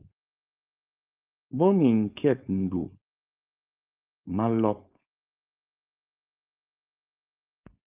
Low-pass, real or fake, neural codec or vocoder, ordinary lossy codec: 3.6 kHz; fake; codec, 16 kHz, 4.8 kbps, FACodec; Opus, 32 kbps